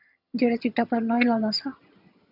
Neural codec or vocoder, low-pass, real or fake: vocoder, 22.05 kHz, 80 mel bands, HiFi-GAN; 5.4 kHz; fake